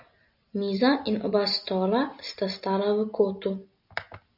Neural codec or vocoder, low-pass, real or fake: none; 5.4 kHz; real